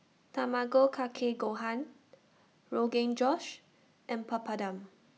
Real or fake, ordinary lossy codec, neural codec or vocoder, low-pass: real; none; none; none